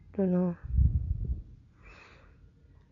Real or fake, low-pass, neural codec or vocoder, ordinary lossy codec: real; 7.2 kHz; none; none